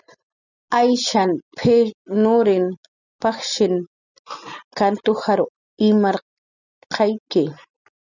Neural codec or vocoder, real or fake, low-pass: none; real; 7.2 kHz